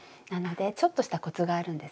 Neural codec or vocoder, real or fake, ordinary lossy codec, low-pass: none; real; none; none